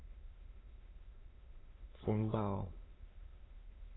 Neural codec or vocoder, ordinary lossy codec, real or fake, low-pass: autoencoder, 22.05 kHz, a latent of 192 numbers a frame, VITS, trained on many speakers; AAC, 16 kbps; fake; 7.2 kHz